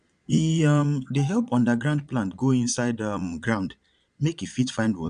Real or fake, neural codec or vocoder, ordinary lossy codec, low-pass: fake; vocoder, 22.05 kHz, 80 mel bands, Vocos; none; 9.9 kHz